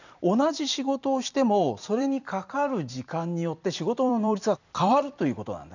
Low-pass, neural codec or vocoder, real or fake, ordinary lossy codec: 7.2 kHz; vocoder, 44.1 kHz, 128 mel bands every 256 samples, BigVGAN v2; fake; none